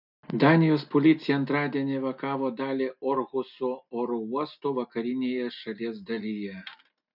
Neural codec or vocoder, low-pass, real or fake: none; 5.4 kHz; real